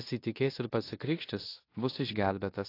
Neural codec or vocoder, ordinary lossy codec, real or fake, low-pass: codec, 16 kHz in and 24 kHz out, 0.9 kbps, LongCat-Audio-Codec, four codebook decoder; AAC, 32 kbps; fake; 5.4 kHz